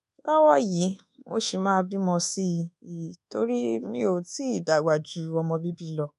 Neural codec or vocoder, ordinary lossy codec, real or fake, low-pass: codec, 24 kHz, 1.2 kbps, DualCodec; none; fake; 10.8 kHz